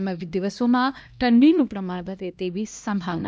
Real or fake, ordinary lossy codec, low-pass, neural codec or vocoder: fake; none; none; codec, 16 kHz, 1 kbps, X-Codec, HuBERT features, trained on LibriSpeech